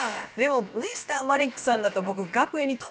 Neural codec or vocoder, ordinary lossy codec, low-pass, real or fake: codec, 16 kHz, about 1 kbps, DyCAST, with the encoder's durations; none; none; fake